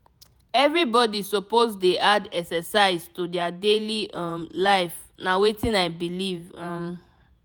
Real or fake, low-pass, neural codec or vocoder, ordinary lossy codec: fake; none; vocoder, 48 kHz, 128 mel bands, Vocos; none